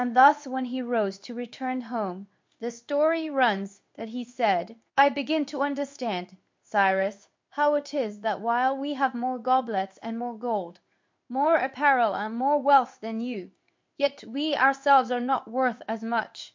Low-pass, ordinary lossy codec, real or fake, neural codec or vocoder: 7.2 kHz; MP3, 48 kbps; fake; codec, 16 kHz in and 24 kHz out, 1 kbps, XY-Tokenizer